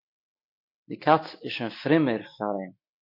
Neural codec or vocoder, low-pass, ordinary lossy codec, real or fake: none; 5.4 kHz; MP3, 32 kbps; real